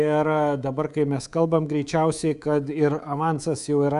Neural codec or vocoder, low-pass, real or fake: codec, 24 kHz, 3.1 kbps, DualCodec; 10.8 kHz; fake